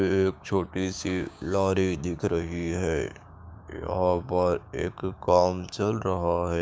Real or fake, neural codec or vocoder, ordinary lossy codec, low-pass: fake; codec, 16 kHz, 6 kbps, DAC; none; none